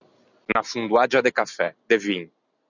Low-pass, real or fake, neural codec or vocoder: 7.2 kHz; real; none